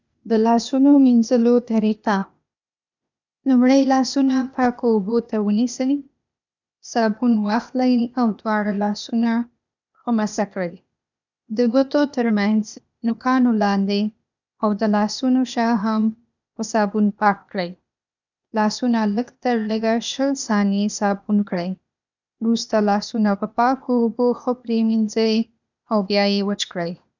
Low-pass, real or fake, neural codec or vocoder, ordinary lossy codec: 7.2 kHz; fake; codec, 16 kHz, 0.8 kbps, ZipCodec; none